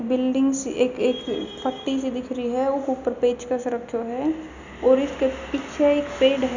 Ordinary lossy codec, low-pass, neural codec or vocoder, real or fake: none; 7.2 kHz; none; real